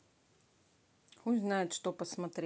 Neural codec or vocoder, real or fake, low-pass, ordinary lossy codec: none; real; none; none